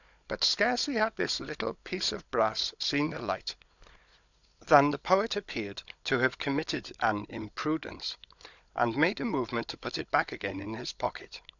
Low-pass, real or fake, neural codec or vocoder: 7.2 kHz; fake; codec, 16 kHz, 16 kbps, FunCodec, trained on Chinese and English, 50 frames a second